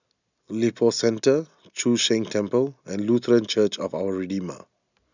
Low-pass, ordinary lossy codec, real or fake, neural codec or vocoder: 7.2 kHz; none; real; none